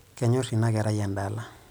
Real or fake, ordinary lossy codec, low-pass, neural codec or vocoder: real; none; none; none